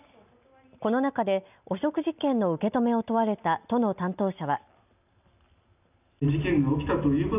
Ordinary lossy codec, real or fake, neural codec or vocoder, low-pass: none; real; none; 3.6 kHz